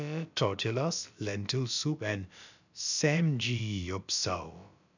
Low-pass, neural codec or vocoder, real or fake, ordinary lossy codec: 7.2 kHz; codec, 16 kHz, about 1 kbps, DyCAST, with the encoder's durations; fake; none